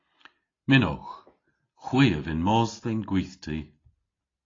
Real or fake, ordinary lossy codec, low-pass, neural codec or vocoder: real; AAC, 32 kbps; 7.2 kHz; none